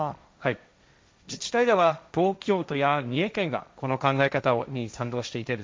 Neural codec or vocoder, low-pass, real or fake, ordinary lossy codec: codec, 16 kHz, 1.1 kbps, Voila-Tokenizer; none; fake; none